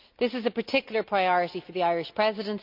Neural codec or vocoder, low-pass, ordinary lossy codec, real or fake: none; 5.4 kHz; none; real